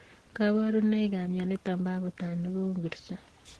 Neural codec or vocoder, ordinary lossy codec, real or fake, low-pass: codec, 44.1 kHz, 7.8 kbps, Pupu-Codec; Opus, 16 kbps; fake; 10.8 kHz